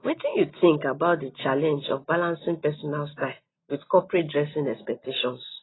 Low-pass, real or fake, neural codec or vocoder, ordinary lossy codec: 7.2 kHz; real; none; AAC, 16 kbps